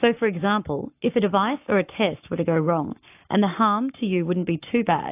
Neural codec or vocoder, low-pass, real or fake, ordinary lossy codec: codec, 44.1 kHz, 7.8 kbps, Pupu-Codec; 3.6 kHz; fake; AAC, 32 kbps